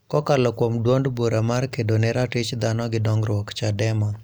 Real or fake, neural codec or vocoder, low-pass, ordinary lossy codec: real; none; none; none